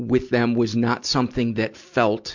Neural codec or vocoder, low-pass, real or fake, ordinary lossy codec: none; 7.2 kHz; real; MP3, 64 kbps